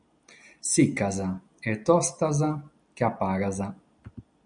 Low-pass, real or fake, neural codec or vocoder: 9.9 kHz; real; none